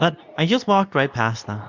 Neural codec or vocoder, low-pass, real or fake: codec, 24 kHz, 0.9 kbps, WavTokenizer, medium speech release version 2; 7.2 kHz; fake